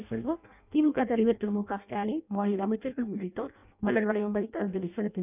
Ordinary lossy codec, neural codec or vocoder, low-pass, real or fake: none; codec, 16 kHz in and 24 kHz out, 0.6 kbps, FireRedTTS-2 codec; 3.6 kHz; fake